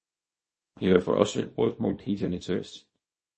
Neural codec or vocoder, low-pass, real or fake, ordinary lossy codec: codec, 24 kHz, 0.9 kbps, WavTokenizer, small release; 10.8 kHz; fake; MP3, 32 kbps